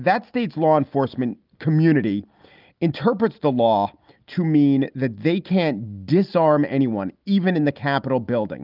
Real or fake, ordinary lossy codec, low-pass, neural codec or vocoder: real; Opus, 24 kbps; 5.4 kHz; none